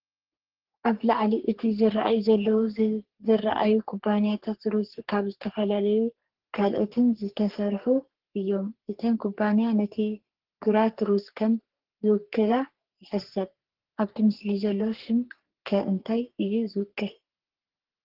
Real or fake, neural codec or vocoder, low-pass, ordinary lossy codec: fake; codec, 44.1 kHz, 3.4 kbps, Pupu-Codec; 5.4 kHz; Opus, 16 kbps